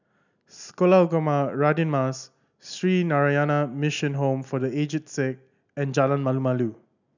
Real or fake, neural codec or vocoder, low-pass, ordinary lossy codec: real; none; 7.2 kHz; none